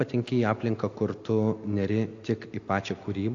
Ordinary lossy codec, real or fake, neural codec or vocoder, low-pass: AAC, 48 kbps; real; none; 7.2 kHz